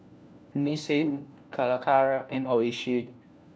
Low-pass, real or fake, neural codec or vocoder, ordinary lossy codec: none; fake; codec, 16 kHz, 1 kbps, FunCodec, trained on LibriTTS, 50 frames a second; none